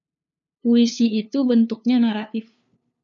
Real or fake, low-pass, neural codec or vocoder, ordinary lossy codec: fake; 7.2 kHz; codec, 16 kHz, 2 kbps, FunCodec, trained on LibriTTS, 25 frames a second; MP3, 96 kbps